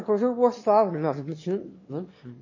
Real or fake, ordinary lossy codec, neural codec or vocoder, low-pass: fake; MP3, 32 kbps; autoencoder, 22.05 kHz, a latent of 192 numbers a frame, VITS, trained on one speaker; 7.2 kHz